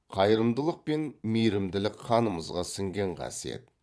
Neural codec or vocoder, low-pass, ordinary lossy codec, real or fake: vocoder, 22.05 kHz, 80 mel bands, Vocos; none; none; fake